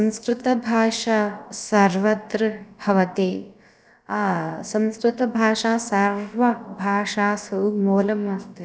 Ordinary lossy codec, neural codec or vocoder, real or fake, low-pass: none; codec, 16 kHz, about 1 kbps, DyCAST, with the encoder's durations; fake; none